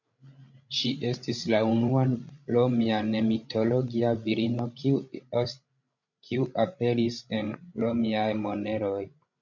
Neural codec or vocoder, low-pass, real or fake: codec, 16 kHz, 16 kbps, FreqCodec, larger model; 7.2 kHz; fake